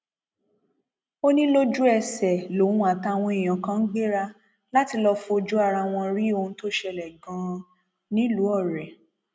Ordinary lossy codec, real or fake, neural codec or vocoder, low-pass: none; real; none; none